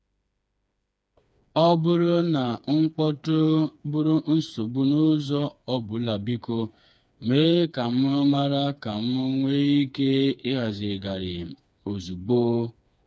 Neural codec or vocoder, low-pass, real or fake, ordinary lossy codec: codec, 16 kHz, 4 kbps, FreqCodec, smaller model; none; fake; none